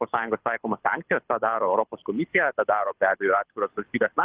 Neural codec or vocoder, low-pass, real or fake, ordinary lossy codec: codec, 24 kHz, 6 kbps, HILCodec; 3.6 kHz; fake; Opus, 16 kbps